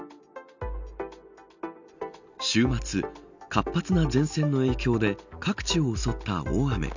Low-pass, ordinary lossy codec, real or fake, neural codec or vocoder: 7.2 kHz; none; real; none